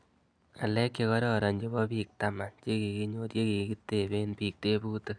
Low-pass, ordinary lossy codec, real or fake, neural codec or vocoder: 9.9 kHz; none; real; none